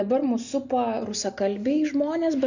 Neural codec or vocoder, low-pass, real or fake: none; 7.2 kHz; real